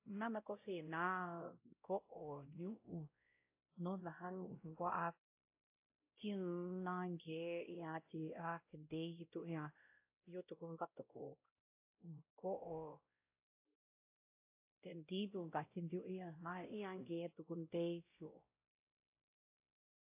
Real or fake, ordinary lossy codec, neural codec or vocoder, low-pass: fake; AAC, 32 kbps; codec, 16 kHz, 0.5 kbps, X-Codec, WavLM features, trained on Multilingual LibriSpeech; 3.6 kHz